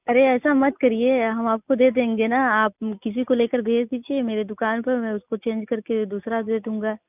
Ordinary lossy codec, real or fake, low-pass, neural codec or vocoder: none; real; 3.6 kHz; none